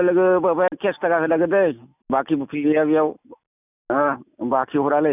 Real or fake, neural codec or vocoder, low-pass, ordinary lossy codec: real; none; 3.6 kHz; none